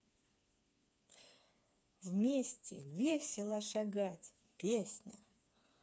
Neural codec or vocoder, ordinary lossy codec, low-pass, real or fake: codec, 16 kHz, 4 kbps, FreqCodec, smaller model; none; none; fake